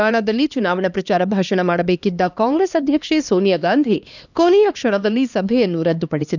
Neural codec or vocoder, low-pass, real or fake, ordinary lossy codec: codec, 16 kHz, 2 kbps, X-Codec, HuBERT features, trained on LibriSpeech; 7.2 kHz; fake; none